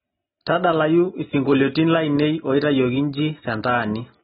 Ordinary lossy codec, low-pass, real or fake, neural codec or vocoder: AAC, 16 kbps; 10.8 kHz; real; none